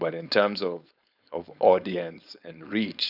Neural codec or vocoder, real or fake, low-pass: codec, 16 kHz, 4.8 kbps, FACodec; fake; 5.4 kHz